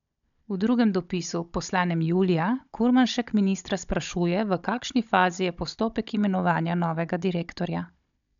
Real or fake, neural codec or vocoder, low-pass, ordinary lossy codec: fake; codec, 16 kHz, 16 kbps, FunCodec, trained on Chinese and English, 50 frames a second; 7.2 kHz; none